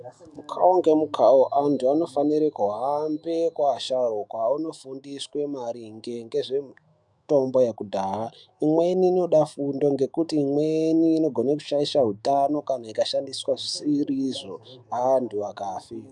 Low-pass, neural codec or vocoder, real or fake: 10.8 kHz; autoencoder, 48 kHz, 128 numbers a frame, DAC-VAE, trained on Japanese speech; fake